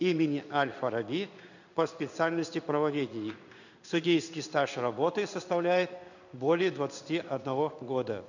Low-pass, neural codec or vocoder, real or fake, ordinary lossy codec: 7.2 kHz; codec, 16 kHz in and 24 kHz out, 1 kbps, XY-Tokenizer; fake; none